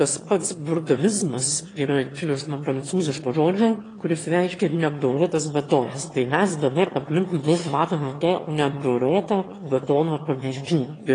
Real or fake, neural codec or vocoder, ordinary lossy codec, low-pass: fake; autoencoder, 22.05 kHz, a latent of 192 numbers a frame, VITS, trained on one speaker; AAC, 32 kbps; 9.9 kHz